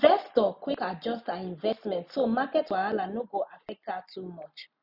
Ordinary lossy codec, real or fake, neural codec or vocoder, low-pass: none; real; none; 5.4 kHz